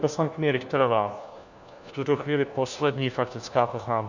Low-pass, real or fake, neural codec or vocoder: 7.2 kHz; fake; codec, 16 kHz, 1 kbps, FunCodec, trained on LibriTTS, 50 frames a second